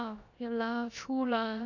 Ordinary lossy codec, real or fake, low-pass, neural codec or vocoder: none; fake; 7.2 kHz; codec, 16 kHz, about 1 kbps, DyCAST, with the encoder's durations